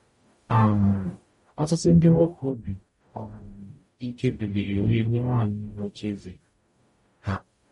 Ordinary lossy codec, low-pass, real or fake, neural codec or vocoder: MP3, 48 kbps; 19.8 kHz; fake; codec, 44.1 kHz, 0.9 kbps, DAC